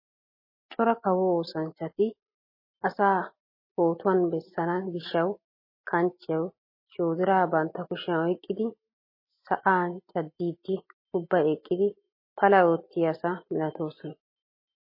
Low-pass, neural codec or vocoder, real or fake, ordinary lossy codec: 5.4 kHz; none; real; MP3, 24 kbps